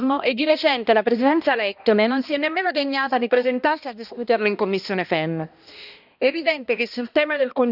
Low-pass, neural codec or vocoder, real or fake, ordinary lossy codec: 5.4 kHz; codec, 16 kHz, 1 kbps, X-Codec, HuBERT features, trained on balanced general audio; fake; none